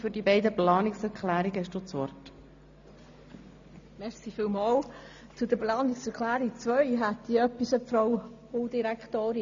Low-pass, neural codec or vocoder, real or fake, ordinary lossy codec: 7.2 kHz; none; real; AAC, 64 kbps